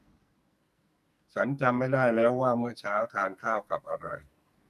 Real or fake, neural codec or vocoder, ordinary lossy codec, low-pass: fake; codec, 44.1 kHz, 2.6 kbps, SNAC; none; 14.4 kHz